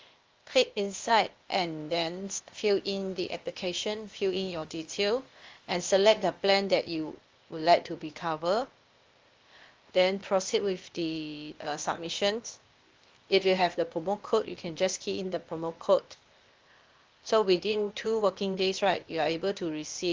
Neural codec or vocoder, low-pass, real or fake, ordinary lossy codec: codec, 16 kHz, 0.8 kbps, ZipCodec; 7.2 kHz; fake; Opus, 32 kbps